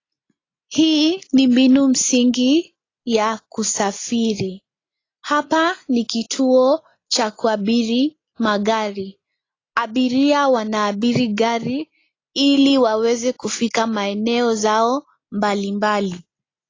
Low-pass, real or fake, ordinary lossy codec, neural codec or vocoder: 7.2 kHz; real; AAC, 32 kbps; none